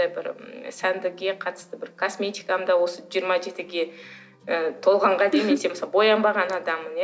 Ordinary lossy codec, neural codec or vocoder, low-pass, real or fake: none; none; none; real